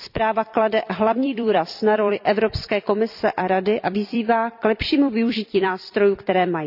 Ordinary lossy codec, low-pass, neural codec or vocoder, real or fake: none; 5.4 kHz; vocoder, 44.1 kHz, 128 mel bands every 256 samples, BigVGAN v2; fake